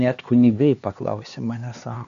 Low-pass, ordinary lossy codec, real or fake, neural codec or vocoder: 7.2 kHz; AAC, 96 kbps; fake; codec, 16 kHz, 2 kbps, X-Codec, HuBERT features, trained on LibriSpeech